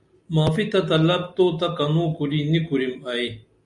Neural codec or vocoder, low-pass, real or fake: none; 10.8 kHz; real